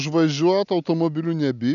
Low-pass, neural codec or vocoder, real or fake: 7.2 kHz; none; real